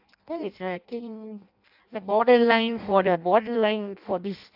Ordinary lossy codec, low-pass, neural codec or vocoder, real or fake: AAC, 48 kbps; 5.4 kHz; codec, 16 kHz in and 24 kHz out, 0.6 kbps, FireRedTTS-2 codec; fake